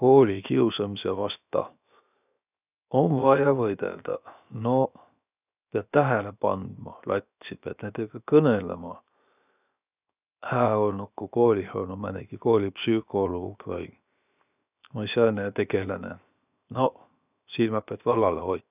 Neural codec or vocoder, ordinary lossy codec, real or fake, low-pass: codec, 16 kHz, 0.7 kbps, FocalCodec; none; fake; 3.6 kHz